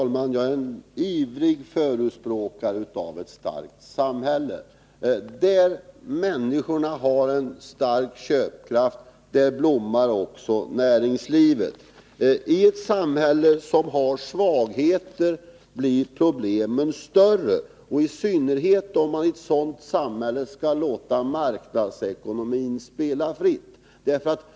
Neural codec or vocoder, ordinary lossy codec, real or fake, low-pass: none; none; real; none